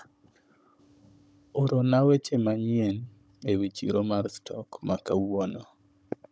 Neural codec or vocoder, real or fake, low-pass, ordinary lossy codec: codec, 16 kHz, 16 kbps, FunCodec, trained on Chinese and English, 50 frames a second; fake; none; none